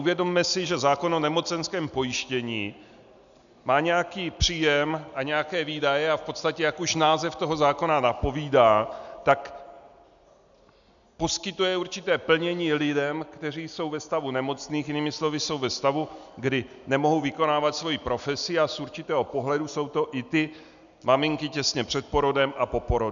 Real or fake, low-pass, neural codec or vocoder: real; 7.2 kHz; none